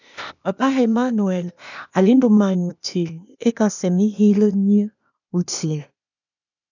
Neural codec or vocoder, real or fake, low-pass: codec, 16 kHz, 0.8 kbps, ZipCodec; fake; 7.2 kHz